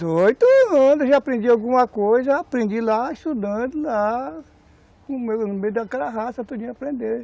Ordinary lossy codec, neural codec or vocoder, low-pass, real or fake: none; none; none; real